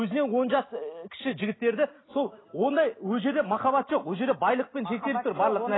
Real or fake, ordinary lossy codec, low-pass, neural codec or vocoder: real; AAC, 16 kbps; 7.2 kHz; none